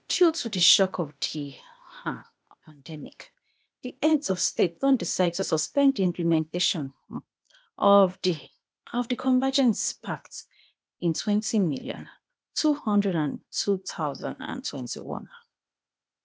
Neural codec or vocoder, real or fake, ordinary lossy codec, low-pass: codec, 16 kHz, 0.8 kbps, ZipCodec; fake; none; none